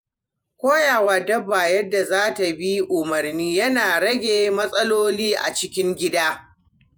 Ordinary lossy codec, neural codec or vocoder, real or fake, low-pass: none; vocoder, 48 kHz, 128 mel bands, Vocos; fake; none